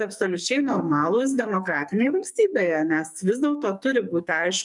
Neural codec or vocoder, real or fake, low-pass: codec, 44.1 kHz, 2.6 kbps, SNAC; fake; 10.8 kHz